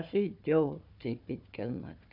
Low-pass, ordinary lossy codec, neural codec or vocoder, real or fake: 5.4 kHz; none; codec, 16 kHz, 4 kbps, FunCodec, trained on Chinese and English, 50 frames a second; fake